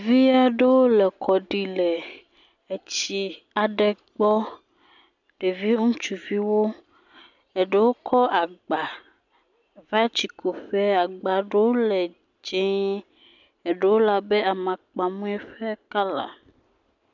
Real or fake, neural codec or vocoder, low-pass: real; none; 7.2 kHz